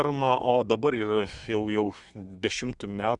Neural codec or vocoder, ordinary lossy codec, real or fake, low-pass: codec, 32 kHz, 1.9 kbps, SNAC; Opus, 64 kbps; fake; 10.8 kHz